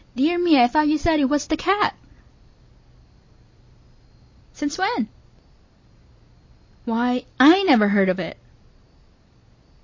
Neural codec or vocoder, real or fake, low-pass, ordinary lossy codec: none; real; 7.2 kHz; MP3, 32 kbps